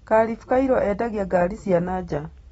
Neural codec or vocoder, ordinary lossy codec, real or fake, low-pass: none; AAC, 24 kbps; real; 19.8 kHz